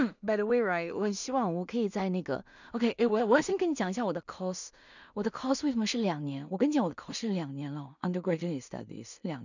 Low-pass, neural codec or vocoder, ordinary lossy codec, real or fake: 7.2 kHz; codec, 16 kHz in and 24 kHz out, 0.4 kbps, LongCat-Audio-Codec, two codebook decoder; none; fake